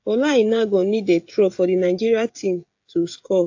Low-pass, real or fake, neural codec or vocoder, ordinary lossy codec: 7.2 kHz; fake; codec, 16 kHz, 16 kbps, FreqCodec, smaller model; AAC, 48 kbps